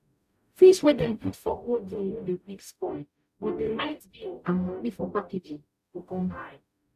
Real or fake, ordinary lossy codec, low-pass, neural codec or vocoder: fake; none; 14.4 kHz; codec, 44.1 kHz, 0.9 kbps, DAC